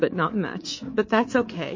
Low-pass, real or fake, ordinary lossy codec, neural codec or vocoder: 7.2 kHz; fake; MP3, 32 kbps; codec, 24 kHz, 3.1 kbps, DualCodec